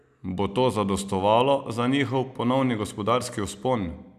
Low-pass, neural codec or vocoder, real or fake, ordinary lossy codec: 14.4 kHz; none; real; none